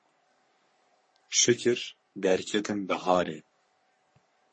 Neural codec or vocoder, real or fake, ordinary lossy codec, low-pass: codec, 44.1 kHz, 3.4 kbps, Pupu-Codec; fake; MP3, 32 kbps; 9.9 kHz